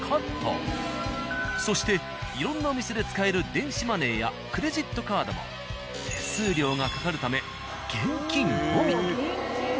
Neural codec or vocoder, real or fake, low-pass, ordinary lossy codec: none; real; none; none